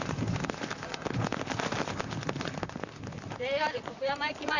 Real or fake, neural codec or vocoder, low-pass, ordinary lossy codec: fake; vocoder, 44.1 kHz, 128 mel bands, Pupu-Vocoder; 7.2 kHz; none